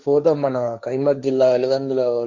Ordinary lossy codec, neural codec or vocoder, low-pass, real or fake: Opus, 64 kbps; codec, 16 kHz, 1.1 kbps, Voila-Tokenizer; 7.2 kHz; fake